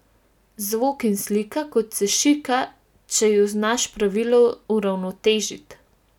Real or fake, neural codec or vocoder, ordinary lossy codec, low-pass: real; none; none; 19.8 kHz